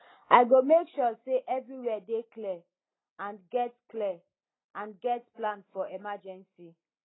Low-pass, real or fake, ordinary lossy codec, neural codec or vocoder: 7.2 kHz; real; AAC, 16 kbps; none